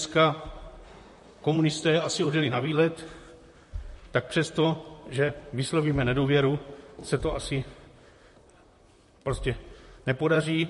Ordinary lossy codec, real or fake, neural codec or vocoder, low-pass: MP3, 48 kbps; fake; vocoder, 44.1 kHz, 128 mel bands, Pupu-Vocoder; 14.4 kHz